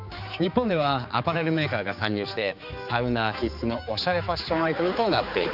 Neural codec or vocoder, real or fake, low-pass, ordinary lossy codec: codec, 16 kHz, 4 kbps, X-Codec, HuBERT features, trained on general audio; fake; 5.4 kHz; none